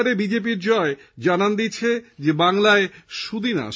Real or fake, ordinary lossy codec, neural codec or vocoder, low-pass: real; none; none; none